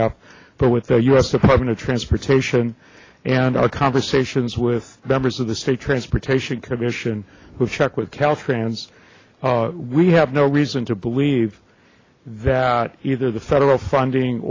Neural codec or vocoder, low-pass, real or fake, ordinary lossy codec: none; 7.2 kHz; real; AAC, 32 kbps